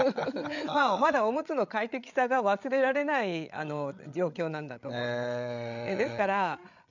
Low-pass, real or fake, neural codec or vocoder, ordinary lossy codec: 7.2 kHz; fake; codec, 16 kHz, 8 kbps, FreqCodec, larger model; none